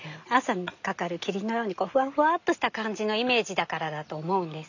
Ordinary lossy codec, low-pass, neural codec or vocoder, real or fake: none; 7.2 kHz; none; real